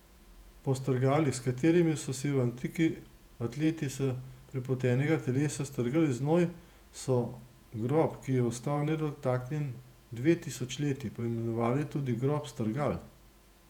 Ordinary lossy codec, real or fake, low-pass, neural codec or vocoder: none; fake; 19.8 kHz; vocoder, 48 kHz, 128 mel bands, Vocos